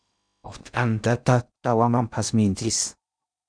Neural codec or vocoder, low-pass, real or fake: codec, 16 kHz in and 24 kHz out, 0.8 kbps, FocalCodec, streaming, 65536 codes; 9.9 kHz; fake